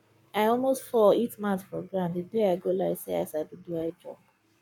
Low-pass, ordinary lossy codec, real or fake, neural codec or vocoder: 19.8 kHz; none; fake; codec, 44.1 kHz, 7.8 kbps, Pupu-Codec